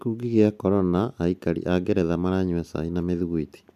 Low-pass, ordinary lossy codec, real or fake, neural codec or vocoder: 14.4 kHz; none; real; none